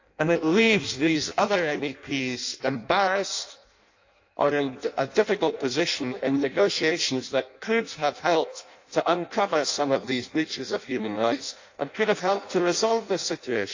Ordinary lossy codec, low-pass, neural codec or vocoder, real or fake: AAC, 48 kbps; 7.2 kHz; codec, 16 kHz in and 24 kHz out, 0.6 kbps, FireRedTTS-2 codec; fake